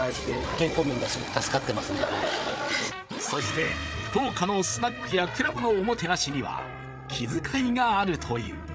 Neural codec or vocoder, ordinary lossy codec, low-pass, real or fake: codec, 16 kHz, 8 kbps, FreqCodec, larger model; none; none; fake